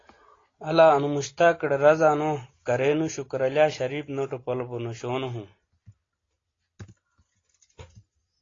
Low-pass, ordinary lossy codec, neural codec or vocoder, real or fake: 7.2 kHz; AAC, 32 kbps; none; real